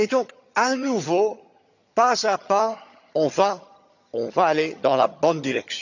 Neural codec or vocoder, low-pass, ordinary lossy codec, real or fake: vocoder, 22.05 kHz, 80 mel bands, HiFi-GAN; 7.2 kHz; none; fake